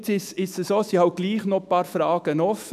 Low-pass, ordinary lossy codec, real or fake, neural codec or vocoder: 14.4 kHz; none; fake; autoencoder, 48 kHz, 128 numbers a frame, DAC-VAE, trained on Japanese speech